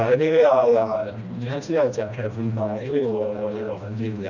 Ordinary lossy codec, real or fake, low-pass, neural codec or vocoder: none; fake; 7.2 kHz; codec, 16 kHz, 1 kbps, FreqCodec, smaller model